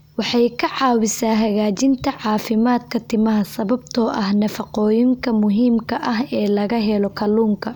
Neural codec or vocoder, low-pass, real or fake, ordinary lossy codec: none; none; real; none